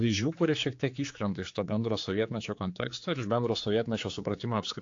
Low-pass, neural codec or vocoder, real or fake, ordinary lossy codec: 7.2 kHz; codec, 16 kHz, 4 kbps, X-Codec, HuBERT features, trained on general audio; fake; AAC, 48 kbps